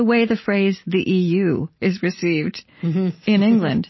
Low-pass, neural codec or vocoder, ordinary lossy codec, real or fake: 7.2 kHz; none; MP3, 24 kbps; real